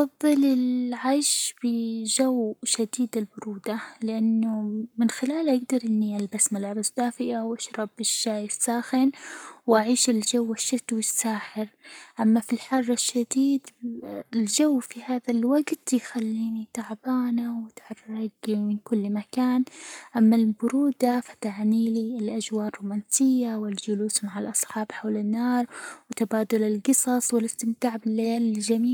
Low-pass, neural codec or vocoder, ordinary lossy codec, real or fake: none; codec, 44.1 kHz, 7.8 kbps, Pupu-Codec; none; fake